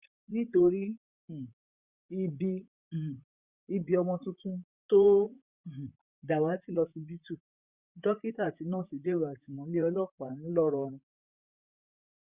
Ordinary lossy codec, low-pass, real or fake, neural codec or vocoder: Opus, 64 kbps; 3.6 kHz; fake; vocoder, 44.1 kHz, 128 mel bands, Pupu-Vocoder